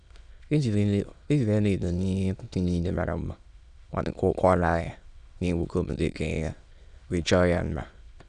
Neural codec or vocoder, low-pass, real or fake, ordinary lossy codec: autoencoder, 22.05 kHz, a latent of 192 numbers a frame, VITS, trained on many speakers; 9.9 kHz; fake; none